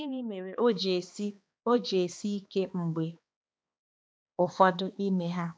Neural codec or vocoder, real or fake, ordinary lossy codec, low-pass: codec, 16 kHz, 2 kbps, X-Codec, HuBERT features, trained on balanced general audio; fake; none; none